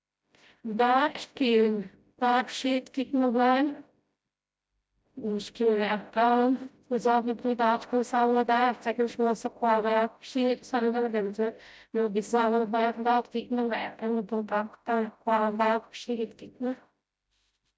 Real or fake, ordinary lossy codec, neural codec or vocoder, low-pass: fake; none; codec, 16 kHz, 0.5 kbps, FreqCodec, smaller model; none